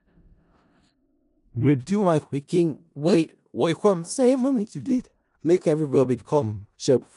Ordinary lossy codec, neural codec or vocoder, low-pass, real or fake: none; codec, 16 kHz in and 24 kHz out, 0.4 kbps, LongCat-Audio-Codec, four codebook decoder; 10.8 kHz; fake